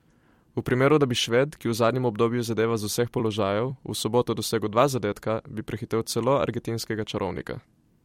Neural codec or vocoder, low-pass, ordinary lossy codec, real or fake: vocoder, 44.1 kHz, 128 mel bands every 256 samples, BigVGAN v2; 19.8 kHz; MP3, 64 kbps; fake